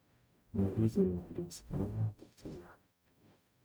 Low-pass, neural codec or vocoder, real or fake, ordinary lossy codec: none; codec, 44.1 kHz, 0.9 kbps, DAC; fake; none